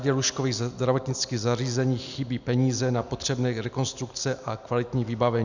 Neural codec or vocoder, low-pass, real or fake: none; 7.2 kHz; real